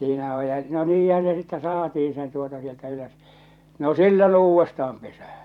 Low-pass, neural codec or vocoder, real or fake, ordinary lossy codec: 19.8 kHz; vocoder, 44.1 kHz, 128 mel bands every 512 samples, BigVGAN v2; fake; none